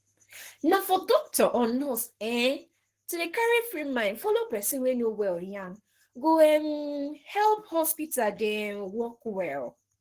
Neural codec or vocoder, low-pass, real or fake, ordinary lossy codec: codec, 44.1 kHz, 7.8 kbps, Pupu-Codec; 14.4 kHz; fake; Opus, 16 kbps